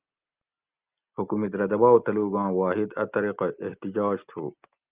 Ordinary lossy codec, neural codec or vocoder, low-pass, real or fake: Opus, 32 kbps; none; 3.6 kHz; real